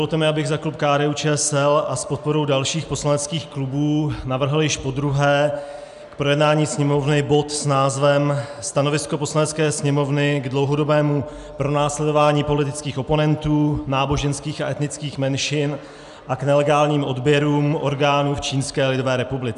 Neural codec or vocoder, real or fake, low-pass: none; real; 10.8 kHz